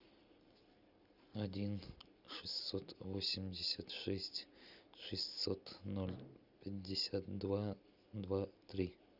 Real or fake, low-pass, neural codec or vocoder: real; 5.4 kHz; none